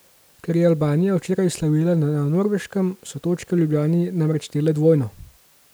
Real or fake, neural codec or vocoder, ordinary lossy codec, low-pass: real; none; none; none